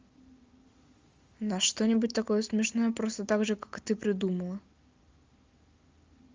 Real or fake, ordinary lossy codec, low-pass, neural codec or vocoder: real; Opus, 32 kbps; 7.2 kHz; none